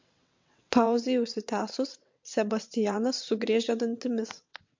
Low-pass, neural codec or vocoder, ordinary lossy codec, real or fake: 7.2 kHz; vocoder, 22.05 kHz, 80 mel bands, WaveNeXt; MP3, 48 kbps; fake